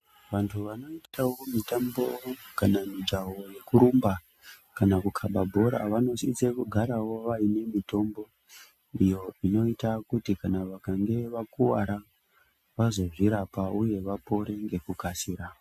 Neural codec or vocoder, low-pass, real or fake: none; 14.4 kHz; real